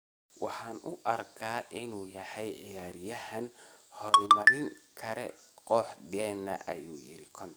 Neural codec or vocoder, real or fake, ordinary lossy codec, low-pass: codec, 44.1 kHz, 7.8 kbps, DAC; fake; none; none